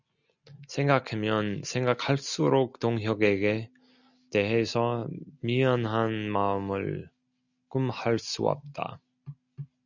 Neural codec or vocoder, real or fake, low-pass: none; real; 7.2 kHz